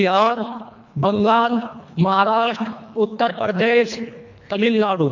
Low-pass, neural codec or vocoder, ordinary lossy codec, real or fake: 7.2 kHz; codec, 24 kHz, 1.5 kbps, HILCodec; MP3, 48 kbps; fake